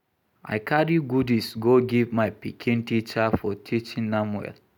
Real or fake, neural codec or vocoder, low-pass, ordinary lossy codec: real; none; 19.8 kHz; none